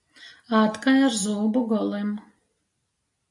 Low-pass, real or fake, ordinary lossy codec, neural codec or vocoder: 10.8 kHz; real; AAC, 64 kbps; none